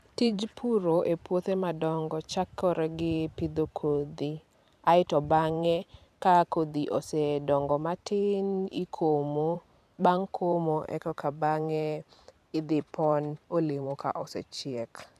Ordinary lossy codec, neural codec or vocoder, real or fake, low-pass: none; vocoder, 44.1 kHz, 128 mel bands every 512 samples, BigVGAN v2; fake; 14.4 kHz